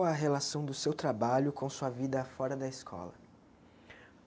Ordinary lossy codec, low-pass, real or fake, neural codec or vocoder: none; none; real; none